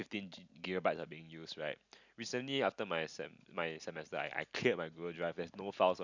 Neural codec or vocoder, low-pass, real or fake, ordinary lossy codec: none; 7.2 kHz; real; none